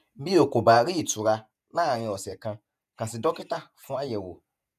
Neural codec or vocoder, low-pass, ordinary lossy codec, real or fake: vocoder, 48 kHz, 128 mel bands, Vocos; 14.4 kHz; none; fake